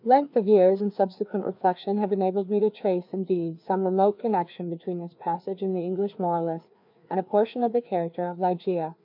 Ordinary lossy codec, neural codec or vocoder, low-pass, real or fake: MP3, 48 kbps; codec, 16 kHz, 2 kbps, FreqCodec, larger model; 5.4 kHz; fake